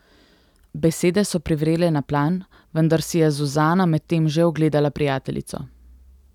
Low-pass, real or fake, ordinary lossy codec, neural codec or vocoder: 19.8 kHz; real; none; none